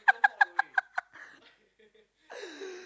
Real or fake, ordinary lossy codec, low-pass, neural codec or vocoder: real; none; none; none